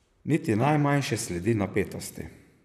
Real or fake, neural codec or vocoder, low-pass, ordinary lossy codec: fake; vocoder, 44.1 kHz, 128 mel bands, Pupu-Vocoder; 14.4 kHz; none